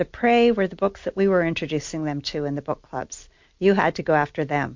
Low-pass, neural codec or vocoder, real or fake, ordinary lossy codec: 7.2 kHz; none; real; MP3, 48 kbps